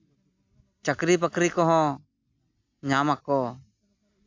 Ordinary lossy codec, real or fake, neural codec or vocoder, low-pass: AAC, 48 kbps; real; none; 7.2 kHz